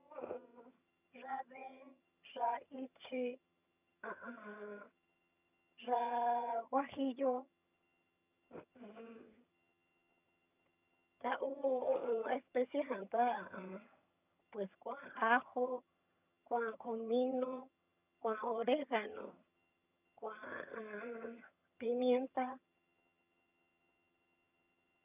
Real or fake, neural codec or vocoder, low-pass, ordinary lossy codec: fake; vocoder, 22.05 kHz, 80 mel bands, HiFi-GAN; 3.6 kHz; none